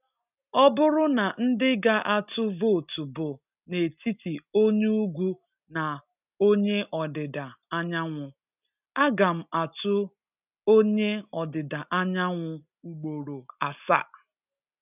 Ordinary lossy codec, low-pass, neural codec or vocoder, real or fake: none; 3.6 kHz; none; real